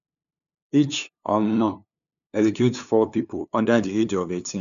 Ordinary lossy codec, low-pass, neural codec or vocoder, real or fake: none; 7.2 kHz; codec, 16 kHz, 2 kbps, FunCodec, trained on LibriTTS, 25 frames a second; fake